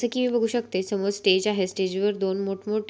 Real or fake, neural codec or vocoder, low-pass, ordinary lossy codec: real; none; none; none